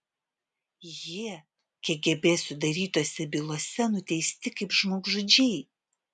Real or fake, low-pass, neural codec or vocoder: real; 10.8 kHz; none